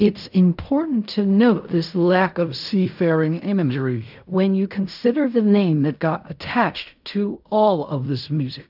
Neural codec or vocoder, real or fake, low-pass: codec, 16 kHz in and 24 kHz out, 0.4 kbps, LongCat-Audio-Codec, fine tuned four codebook decoder; fake; 5.4 kHz